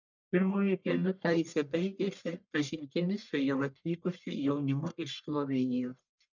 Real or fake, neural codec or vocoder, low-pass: fake; codec, 44.1 kHz, 1.7 kbps, Pupu-Codec; 7.2 kHz